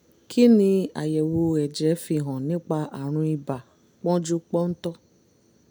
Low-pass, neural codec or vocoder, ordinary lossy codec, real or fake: none; none; none; real